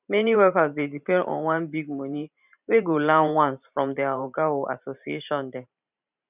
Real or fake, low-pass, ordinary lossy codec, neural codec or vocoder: fake; 3.6 kHz; none; vocoder, 44.1 kHz, 128 mel bands every 512 samples, BigVGAN v2